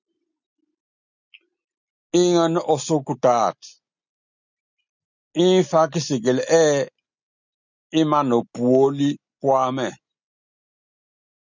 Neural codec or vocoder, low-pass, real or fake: none; 7.2 kHz; real